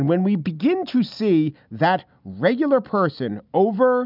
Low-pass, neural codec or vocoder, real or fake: 5.4 kHz; none; real